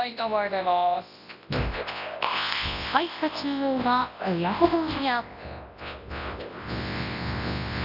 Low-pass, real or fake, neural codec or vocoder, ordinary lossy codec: 5.4 kHz; fake; codec, 24 kHz, 0.9 kbps, WavTokenizer, large speech release; none